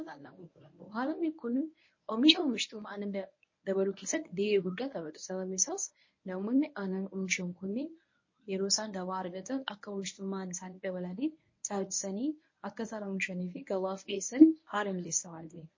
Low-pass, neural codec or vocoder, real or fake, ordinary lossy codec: 7.2 kHz; codec, 24 kHz, 0.9 kbps, WavTokenizer, medium speech release version 1; fake; MP3, 32 kbps